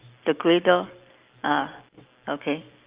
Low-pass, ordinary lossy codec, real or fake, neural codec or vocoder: 3.6 kHz; Opus, 24 kbps; real; none